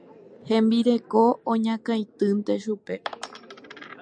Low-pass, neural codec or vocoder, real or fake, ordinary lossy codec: 9.9 kHz; none; real; MP3, 64 kbps